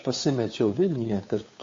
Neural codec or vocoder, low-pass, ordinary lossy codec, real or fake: codec, 16 kHz, 2 kbps, FunCodec, trained on Chinese and English, 25 frames a second; 7.2 kHz; MP3, 32 kbps; fake